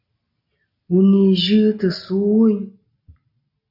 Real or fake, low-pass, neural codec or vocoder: real; 5.4 kHz; none